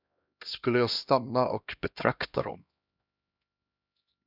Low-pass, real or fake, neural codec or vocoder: 5.4 kHz; fake; codec, 16 kHz, 1 kbps, X-Codec, HuBERT features, trained on LibriSpeech